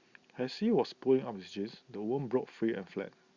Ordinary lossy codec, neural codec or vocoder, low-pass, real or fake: Opus, 64 kbps; none; 7.2 kHz; real